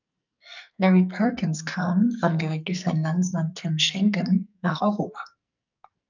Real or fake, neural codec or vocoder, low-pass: fake; codec, 44.1 kHz, 2.6 kbps, SNAC; 7.2 kHz